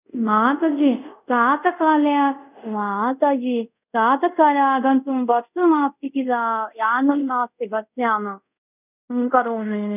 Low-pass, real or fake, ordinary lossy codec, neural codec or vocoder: 3.6 kHz; fake; none; codec, 24 kHz, 0.5 kbps, DualCodec